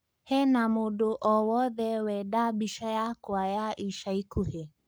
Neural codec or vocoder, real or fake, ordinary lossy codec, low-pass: codec, 44.1 kHz, 7.8 kbps, Pupu-Codec; fake; none; none